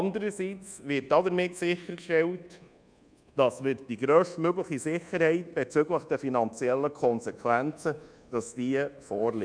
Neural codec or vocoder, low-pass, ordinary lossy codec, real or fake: codec, 24 kHz, 1.2 kbps, DualCodec; 9.9 kHz; none; fake